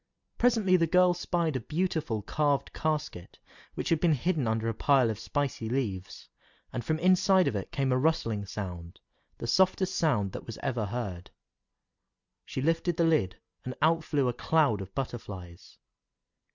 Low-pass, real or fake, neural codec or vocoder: 7.2 kHz; real; none